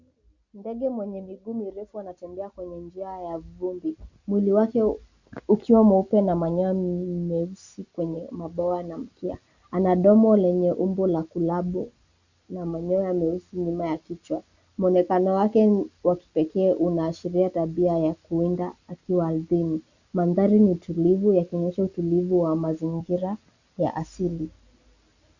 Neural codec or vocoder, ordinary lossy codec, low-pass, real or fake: none; Opus, 64 kbps; 7.2 kHz; real